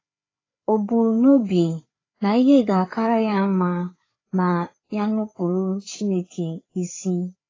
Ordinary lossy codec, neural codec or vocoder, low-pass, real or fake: AAC, 32 kbps; codec, 16 kHz, 4 kbps, FreqCodec, larger model; 7.2 kHz; fake